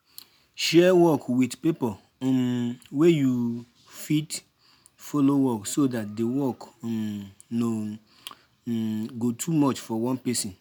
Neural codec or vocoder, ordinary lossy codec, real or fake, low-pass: none; none; real; none